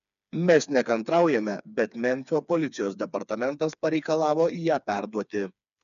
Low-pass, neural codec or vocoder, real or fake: 7.2 kHz; codec, 16 kHz, 4 kbps, FreqCodec, smaller model; fake